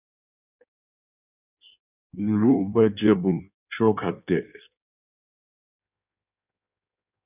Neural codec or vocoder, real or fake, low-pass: codec, 16 kHz in and 24 kHz out, 1.1 kbps, FireRedTTS-2 codec; fake; 3.6 kHz